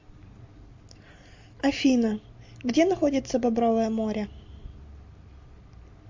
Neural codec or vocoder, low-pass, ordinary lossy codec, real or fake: none; 7.2 kHz; MP3, 48 kbps; real